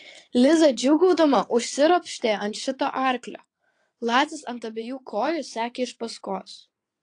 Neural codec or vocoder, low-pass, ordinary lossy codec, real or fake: vocoder, 22.05 kHz, 80 mel bands, WaveNeXt; 9.9 kHz; AAC, 48 kbps; fake